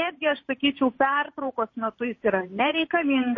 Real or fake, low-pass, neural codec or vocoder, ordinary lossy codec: fake; 7.2 kHz; vocoder, 44.1 kHz, 128 mel bands every 512 samples, BigVGAN v2; MP3, 32 kbps